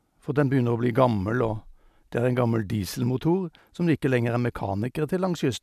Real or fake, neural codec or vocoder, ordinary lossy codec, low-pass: real; none; none; 14.4 kHz